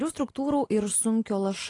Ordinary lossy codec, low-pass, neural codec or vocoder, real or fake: AAC, 32 kbps; 10.8 kHz; none; real